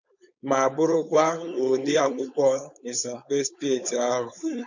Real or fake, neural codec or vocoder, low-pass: fake; codec, 16 kHz, 4.8 kbps, FACodec; 7.2 kHz